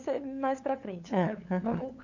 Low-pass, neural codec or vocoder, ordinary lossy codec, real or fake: 7.2 kHz; codec, 16 kHz, 8 kbps, FunCodec, trained on LibriTTS, 25 frames a second; none; fake